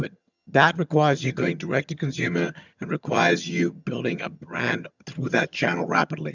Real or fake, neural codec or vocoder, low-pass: fake; vocoder, 22.05 kHz, 80 mel bands, HiFi-GAN; 7.2 kHz